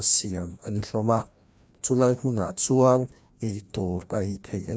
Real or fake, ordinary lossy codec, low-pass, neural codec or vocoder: fake; none; none; codec, 16 kHz, 1 kbps, FreqCodec, larger model